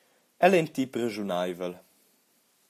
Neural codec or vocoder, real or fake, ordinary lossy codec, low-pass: none; real; AAC, 64 kbps; 14.4 kHz